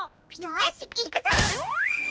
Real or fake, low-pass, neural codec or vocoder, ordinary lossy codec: fake; none; codec, 16 kHz, 1 kbps, X-Codec, HuBERT features, trained on balanced general audio; none